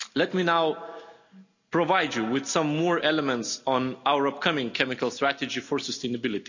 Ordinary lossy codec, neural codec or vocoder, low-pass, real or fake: none; none; 7.2 kHz; real